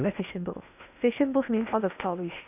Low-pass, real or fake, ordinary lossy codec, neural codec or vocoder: 3.6 kHz; fake; none; codec, 16 kHz in and 24 kHz out, 0.8 kbps, FocalCodec, streaming, 65536 codes